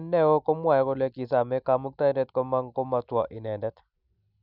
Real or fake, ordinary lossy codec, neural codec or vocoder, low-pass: real; none; none; 5.4 kHz